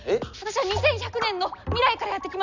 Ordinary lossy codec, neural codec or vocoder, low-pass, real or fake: none; none; 7.2 kHz; real